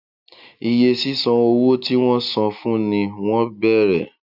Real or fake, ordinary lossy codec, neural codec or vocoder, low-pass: real; none; none; 5.4 kHz